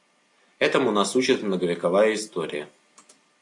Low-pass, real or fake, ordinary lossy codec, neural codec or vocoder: 10.8 kHz; real; AAC, 48 kbps; none